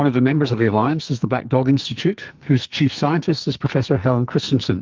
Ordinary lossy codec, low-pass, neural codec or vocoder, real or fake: Opus, 24 kbps; 7.2 kHz; codec, 44.1 kHz, 2.6 kbps, SNAC; fake